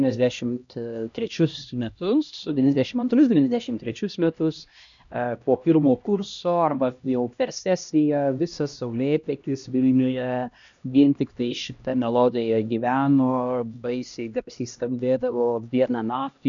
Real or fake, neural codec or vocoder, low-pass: fake; codec, 16 kHz, 1 kbps, X-Codec, HuBERT features, trained on LibriSpeech; 7.2 kHz